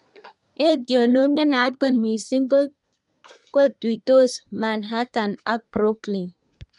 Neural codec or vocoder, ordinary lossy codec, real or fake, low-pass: codec, 24 kHz, 1 kbps, SNAC; none; fake; 10.8 kHz